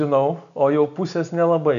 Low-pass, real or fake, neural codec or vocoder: 7.2 kHz; real; none